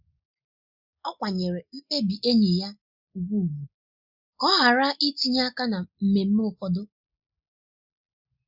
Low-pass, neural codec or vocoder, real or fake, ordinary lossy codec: 5.4 kHz; none; real; Opus, 64 kbps